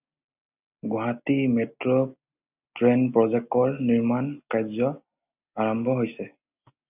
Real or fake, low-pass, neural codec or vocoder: real; 3.6 kHz; none